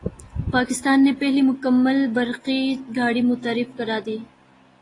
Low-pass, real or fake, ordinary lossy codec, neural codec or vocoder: 10.8 kHz; real; AAC, 32 kbps; none